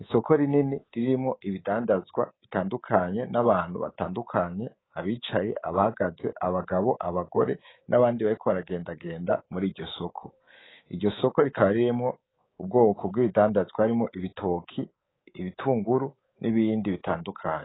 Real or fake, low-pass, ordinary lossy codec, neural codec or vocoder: fake; 7.2 kHz; AAC, 16 kbps; codec, 24 kHz, 3.1 kbps, DualCodec